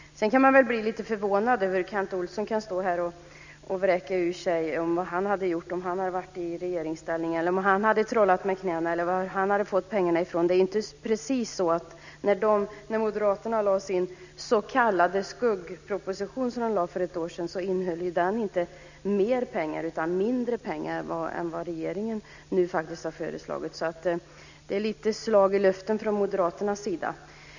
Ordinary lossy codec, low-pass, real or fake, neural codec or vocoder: none; 7.2 kHz; real; none